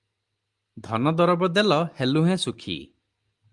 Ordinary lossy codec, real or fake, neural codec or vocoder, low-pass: Opus, 32 kbps; real; none; 10.8 kHz